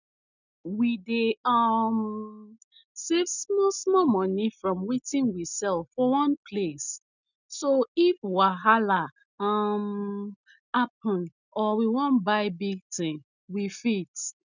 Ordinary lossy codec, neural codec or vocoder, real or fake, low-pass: none; none; real; 7.2 kHz